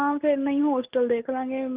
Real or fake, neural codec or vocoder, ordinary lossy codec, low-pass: real; none; Opus, 24 kbps; 3.6 kHz